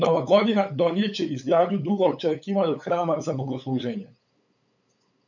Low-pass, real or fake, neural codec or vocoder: 7.2 kHz; fake; codec, 16 kHz, 8 kbps, FunCodec, trained on LibriTTS, 25 frames a second